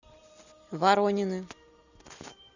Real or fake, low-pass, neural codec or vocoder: real; 7.2 kHz; none